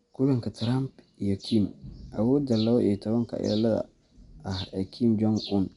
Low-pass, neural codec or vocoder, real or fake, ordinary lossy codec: 10.8 kHz; none; real; none